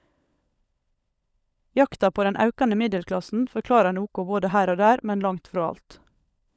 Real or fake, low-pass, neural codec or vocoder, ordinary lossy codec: fake; none; codec, 16 kHz, 16 kbps, FunCodec, trained on LibriTTS, 50 frames a second; none